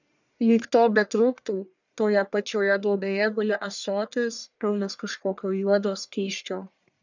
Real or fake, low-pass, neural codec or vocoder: fake; 7.2 kHz; codec, 44.1 kHz, 1.7 kbps, Pupu-Codec